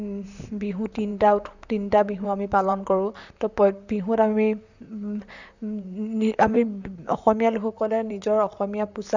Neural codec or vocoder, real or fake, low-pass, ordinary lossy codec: vocoder, 44.1 kHz, 128 mel bands, Pupu-Vocoder; fake; 7.2 kHz; none